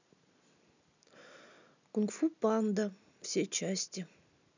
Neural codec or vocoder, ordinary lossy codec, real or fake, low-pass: none; none; real; 7.2 kHz